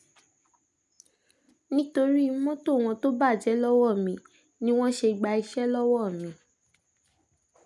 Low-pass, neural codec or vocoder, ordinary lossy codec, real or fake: none; none; none; real